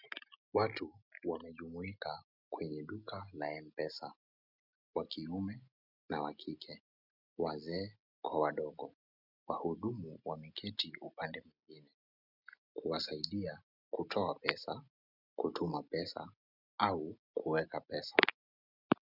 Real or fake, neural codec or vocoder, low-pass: real; none; 5.4 kHz